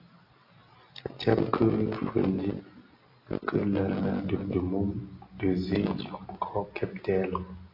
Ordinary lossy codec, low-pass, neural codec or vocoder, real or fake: AAC, 32 kbps; 5.4 kHz; none; real